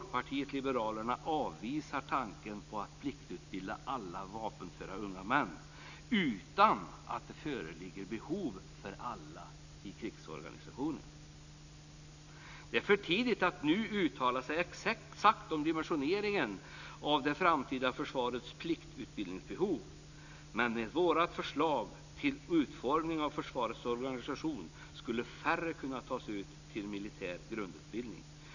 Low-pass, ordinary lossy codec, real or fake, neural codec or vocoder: 7.2 kHz; none; real; none